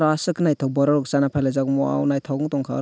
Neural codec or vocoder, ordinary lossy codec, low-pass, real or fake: none; none; none; real